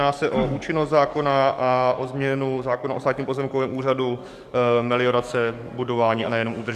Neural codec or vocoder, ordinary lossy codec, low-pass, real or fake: codec, 44.1 kHz, 7.8 kbps, DAC; Opus, 64 kbps; 14.4 kHz; fake